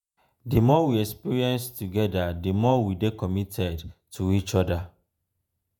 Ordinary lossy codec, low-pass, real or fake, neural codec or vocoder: none; none; fake; vocoder, 48 kHz, 128 mel bands, Vocos